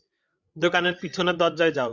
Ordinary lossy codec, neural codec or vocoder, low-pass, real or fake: Opus, 64 kbps; codec, 16 kHz, 16 kbps, FreqCodec, larger model; 7.2 kHz; fake